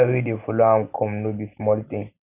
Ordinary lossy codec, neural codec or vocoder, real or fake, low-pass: none; none; real; 3.6 kHz